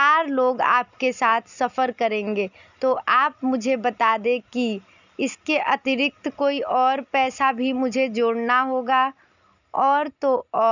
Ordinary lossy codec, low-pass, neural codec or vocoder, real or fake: none; 7.2 kHz; none; real